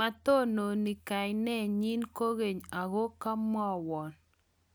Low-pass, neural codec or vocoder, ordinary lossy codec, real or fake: none; none; none; real